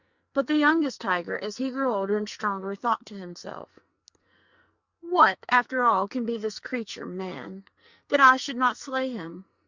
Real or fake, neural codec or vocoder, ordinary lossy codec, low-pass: fake; codec, 44.1 kHz, 2.6 kbps, SNAC; Opus, 64 kbps; 7.2 kHz